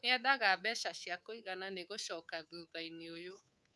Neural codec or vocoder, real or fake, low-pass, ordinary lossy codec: codec, 24 kHz, 3.1 kbps, DualCodec; fake; none; none